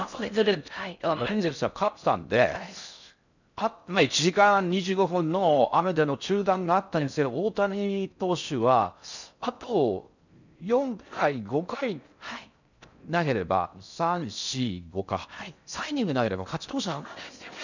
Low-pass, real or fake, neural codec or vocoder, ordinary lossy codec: 7.2 kHz; fake; codec, 16 kHz in and 24 kHz out, 0.6 kbps, FocalCodec, streaming, 4096 codes; none